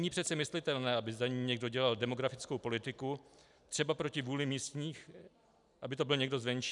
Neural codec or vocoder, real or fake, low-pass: none; real; 10.8 kHz